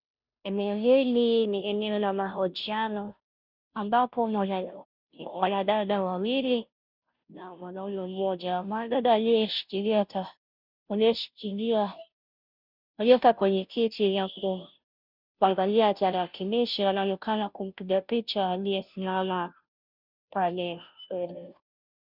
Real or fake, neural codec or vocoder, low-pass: fake; codec, 16 kHz, 0.5 kbps, FunCodec, trained on Chinese and English, 25 frames a second; 5.4 kHz